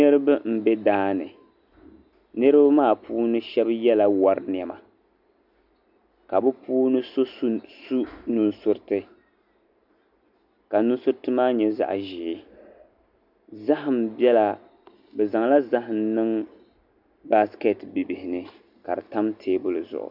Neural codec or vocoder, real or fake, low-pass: none; real; 5.4 kHz